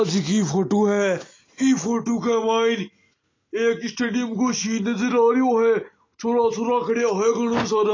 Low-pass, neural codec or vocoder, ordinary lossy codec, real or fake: 7.2 kHz; none; AAC, 32 kbps; real